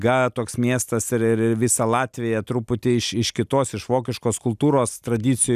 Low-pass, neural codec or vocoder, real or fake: 14.4 kHz; none; real